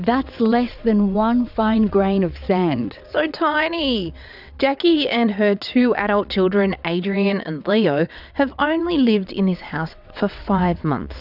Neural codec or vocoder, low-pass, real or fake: vocoder, 22.05 kHz, 80 mel bands, WaveNeXt; 5.4 kHz; fake